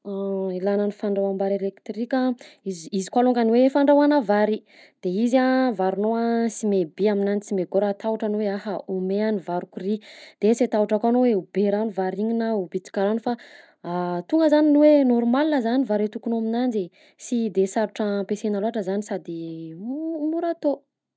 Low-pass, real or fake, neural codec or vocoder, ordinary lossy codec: none; real; none; none